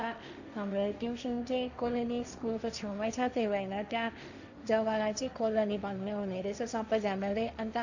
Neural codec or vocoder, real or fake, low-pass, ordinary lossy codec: codec, 16 kHz, 1.1 kbps, Voila-Tokenizer; fake; 7.2 kHz; none